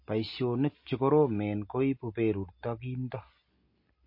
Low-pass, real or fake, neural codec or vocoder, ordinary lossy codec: 5.4 kHz; real; none; MP3, 32 kbps